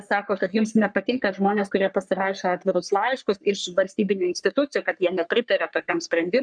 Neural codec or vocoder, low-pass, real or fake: codec, 44.1 kHz, 3.4 kbps, Pupu-Codec; 9.9 kHz; fake